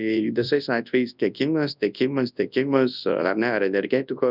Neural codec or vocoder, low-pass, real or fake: codec, 24 kHz, 0.9 kbps, WavTokenizer, large speech release; 5.4 kHz; fake